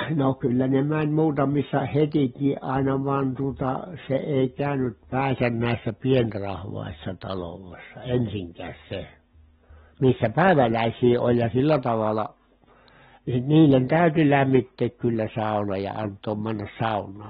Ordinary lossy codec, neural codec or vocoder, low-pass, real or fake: AAC, 16 kbps; none; 10.8 kHz; real